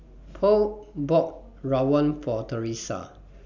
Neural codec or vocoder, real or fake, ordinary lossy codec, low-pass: none; real; none; 7.2 kHz